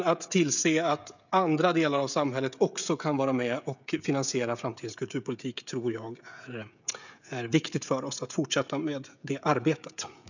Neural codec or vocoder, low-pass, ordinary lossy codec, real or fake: codec, 16 kHz, 16 kbps, FreqCodec, smaller model; 7.2 kHz; none; fake